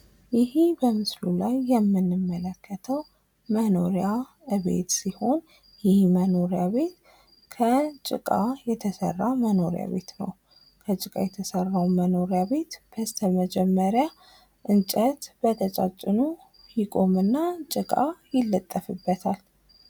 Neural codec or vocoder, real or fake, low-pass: none; real; 19.8 kHz